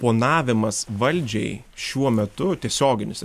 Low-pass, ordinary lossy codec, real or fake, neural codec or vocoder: 14.4 kHz; MP3, 96 kbps; real; none